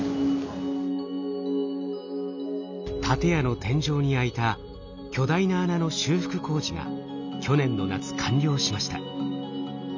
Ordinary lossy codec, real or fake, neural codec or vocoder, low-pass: none; real; none; 7.2 kHz